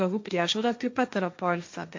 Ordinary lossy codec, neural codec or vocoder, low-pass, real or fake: MP3, 48 kbps; codec, 16 kHz, 1.1 kbps, Voila-Tokenizer; 7.2 kHz; fake